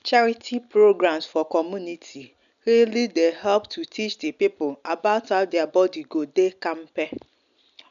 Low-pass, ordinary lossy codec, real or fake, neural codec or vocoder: 7.2 kHz; none; real; none